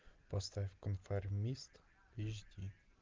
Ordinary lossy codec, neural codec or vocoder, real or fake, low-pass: Opus, 24 kbps; none; real; 7.2 kHz